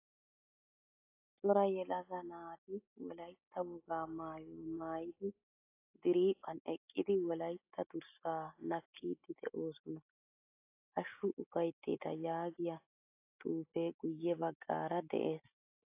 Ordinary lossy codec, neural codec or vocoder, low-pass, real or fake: AAC, 24 kbps; none; 3.6 kHz; real